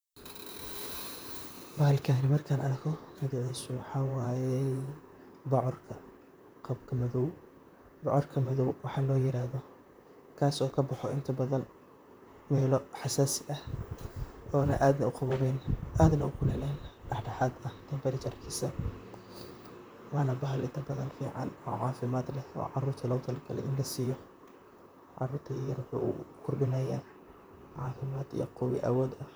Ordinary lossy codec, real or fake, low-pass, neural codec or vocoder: none; fake; none; vocoder, 44.1 kHz, 128 mel bands, Pupu-Vocoder